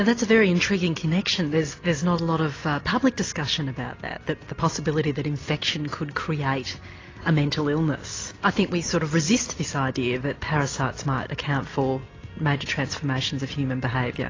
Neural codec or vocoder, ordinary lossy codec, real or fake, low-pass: none; AAC, 32 kbps; real; 7.2 kHz